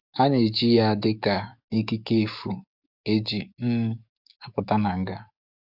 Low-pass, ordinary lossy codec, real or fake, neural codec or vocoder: 5.4 kHz; AAC, 48 kbps; fake; codec, 44.1 kHz, 7.8 kbps, DAC